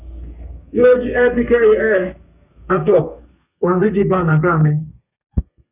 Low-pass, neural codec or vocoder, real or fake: 3.6 kHz; codec, 32 kHz, 1.9 kbps, SNAC; fake